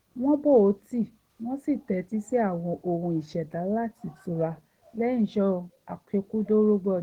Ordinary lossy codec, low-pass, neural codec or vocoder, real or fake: Opus, 16 kbps; 19.8 kHz; none; real